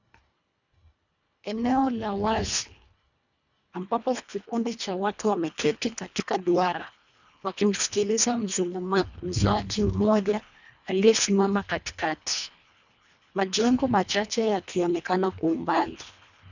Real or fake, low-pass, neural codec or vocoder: fake; 7.2 kHz; codec, 24 kHz, 1.5 kbps, HILCodec